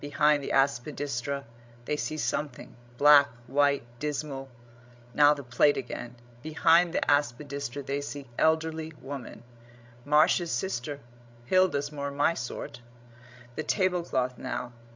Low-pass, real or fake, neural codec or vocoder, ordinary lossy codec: 7.2 kHz; fake; codec, 16 kHz, 16 kbps, FreqCodec, larger model; MP3, 64 kbps